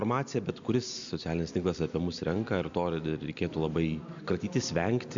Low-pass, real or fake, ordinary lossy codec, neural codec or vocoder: 7.2 kHz; real; MP3, 64 kbps; none